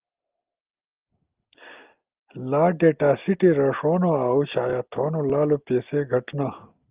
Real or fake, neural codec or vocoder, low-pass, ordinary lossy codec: real; none; 3.6 kHz; Opus, 32 kbps